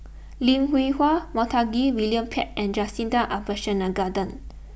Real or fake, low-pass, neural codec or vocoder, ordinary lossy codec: real; none; none; none